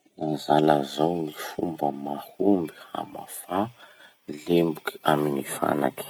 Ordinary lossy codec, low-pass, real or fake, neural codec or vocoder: none; none; real; none